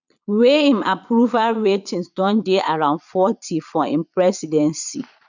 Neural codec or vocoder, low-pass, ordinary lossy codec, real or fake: vocoder, 22.05 kHz, 80 mel bands, Vocos; 7.2 kHz; none; fake